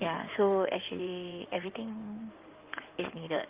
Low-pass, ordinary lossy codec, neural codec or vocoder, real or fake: 3.6 kHz; Opus, 24 kbps; codec, 16 kHz in and 24 kHz out, 2.2 kbps, FireRedTTS-2 codec; fake